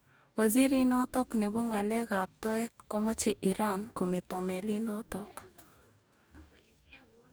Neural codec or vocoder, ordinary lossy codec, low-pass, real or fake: codec, 44.1 kHz, 2.6 kbps, DAC; none; none; fake